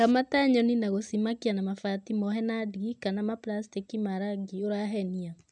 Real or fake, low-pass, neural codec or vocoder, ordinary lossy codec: real; 9.9 kHz; none; none